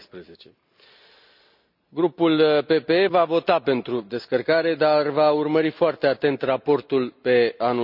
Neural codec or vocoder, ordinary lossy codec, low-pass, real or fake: none; none; 5.4 kHz; real